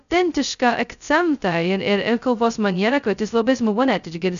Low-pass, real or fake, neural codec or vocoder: 7.2 kHz; fake; codec, 16 kHz, 0.2 kbps, FocalCodec